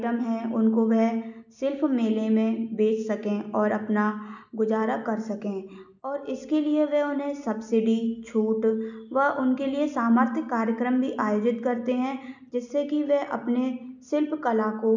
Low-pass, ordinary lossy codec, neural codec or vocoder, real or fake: 7.2 kHz; none; none; real